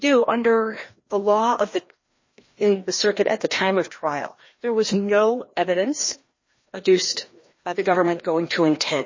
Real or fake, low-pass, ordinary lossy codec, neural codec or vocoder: fake; 7.2 kHz; MP3, 32 kbps; codec, 16 kHz, 1 kbps, FreqCodec, larger model